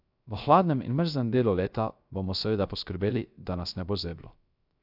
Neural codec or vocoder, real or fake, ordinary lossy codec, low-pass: codec, 16 kHz, 0.3 kbps, FocalCodec; fake; none; 5.4 kHz